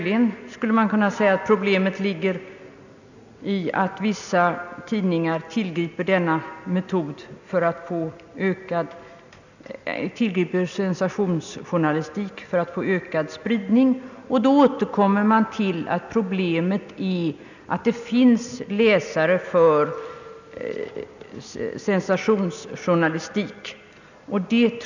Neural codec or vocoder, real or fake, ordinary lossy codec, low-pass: none; real; none; 7.2 kHz